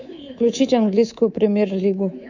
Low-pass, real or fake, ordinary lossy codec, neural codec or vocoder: 7.2 kHz; fake; none; codec, 24 kHz, 3.1 kbps, DualCodec